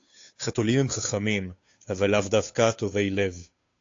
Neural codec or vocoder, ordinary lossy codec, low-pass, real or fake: codec, 16 kHz, 2 kbps, FunCodec, trained on Chinese and English, 25 frames a second; AAC, 48 kbps; 7.2 kHz; fake